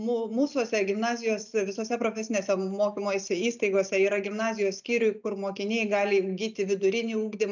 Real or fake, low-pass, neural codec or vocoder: real; 7.2 kHz; none